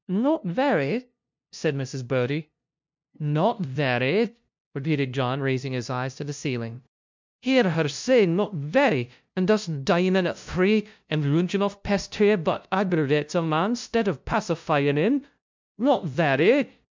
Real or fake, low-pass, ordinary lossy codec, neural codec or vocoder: fake; 7.2 kHz; MP3, 64 kbps; codec, 16 kHz, 0.5 kbps, FunCodec, trained on LibriTTS, 25 frames a second